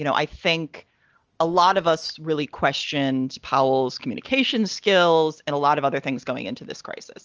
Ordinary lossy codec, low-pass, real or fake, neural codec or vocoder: Opus, 24 kbps; 7.2 kHz; real; none